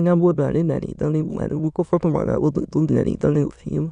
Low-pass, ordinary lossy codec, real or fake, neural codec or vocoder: 9.9 kHz; none; fake; autoencoder, 22.05 kHz, a latent of 192 numbers a frame, VITS, trained on many speakers